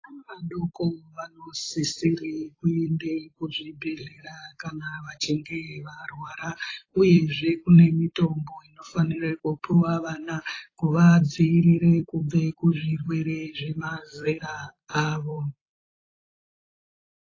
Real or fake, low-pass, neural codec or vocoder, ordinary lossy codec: fake; 7.2 kHz; vocoder, 44.1 kHz, 128 mel bands every 256 samples, BigVGAN v2; AAC, 32 kbps